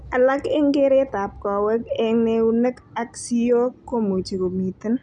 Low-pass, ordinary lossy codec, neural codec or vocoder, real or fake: 10.8 kHz; none; none; real